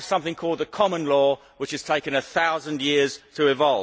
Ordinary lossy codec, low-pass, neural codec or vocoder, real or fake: none; none; none; real